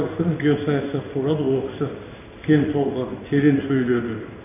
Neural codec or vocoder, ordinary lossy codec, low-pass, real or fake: codec, 16 kHz in and 24 kHz out, 1 kbps, XY-Tokenizer; none; 3.6 kHz; fake